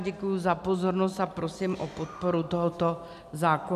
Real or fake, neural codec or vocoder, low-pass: real; none; 14.4 kHz